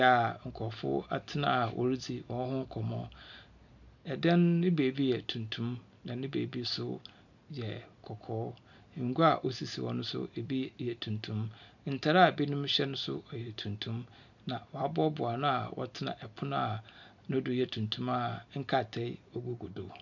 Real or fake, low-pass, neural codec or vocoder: real; 7.2 kHz; none